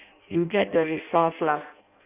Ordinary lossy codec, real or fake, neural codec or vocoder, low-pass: none; fake; codec, 16 kHz in and 24 kHz out, 0.6 kbps, FireRedTTS-2 codec; 3.6 kHz